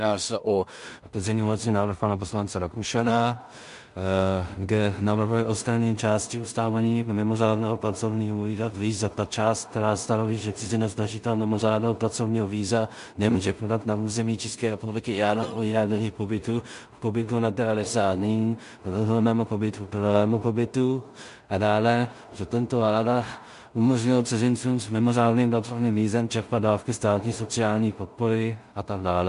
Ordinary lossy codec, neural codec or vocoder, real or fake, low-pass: MP3, 64 kbps; codec, 16 kHz in and 24 kHz out, 0.4 kbps, LongCat-Audio-Codec, two codebook decoder; fake; 10.8 kHz